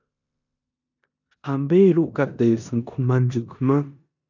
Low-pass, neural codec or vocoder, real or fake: 7.2 kHz; codec, 16 kHz in and 24 kHz out, 0.9 kbps, LongCat-Audio-Codec, four codebook decoder; fake